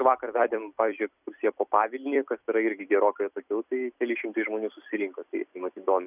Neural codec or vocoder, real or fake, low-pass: vocoder, 44.1 kHz, 128 mel bands every 256 samples, BigVGAN v2; fake; 3.6 kHz